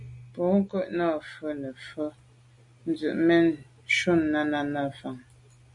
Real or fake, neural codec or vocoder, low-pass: real; none; 10.8 kHz